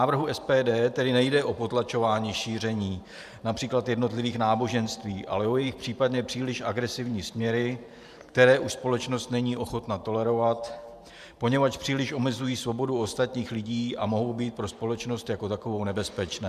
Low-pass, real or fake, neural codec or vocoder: 14.4 kHz; fake; vocoder, 44.1 kHz, 128 mel bands every 512 samples, BigVGAN v2